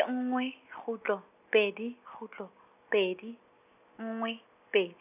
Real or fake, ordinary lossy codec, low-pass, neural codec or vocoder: real; none; 3.6 kHz; none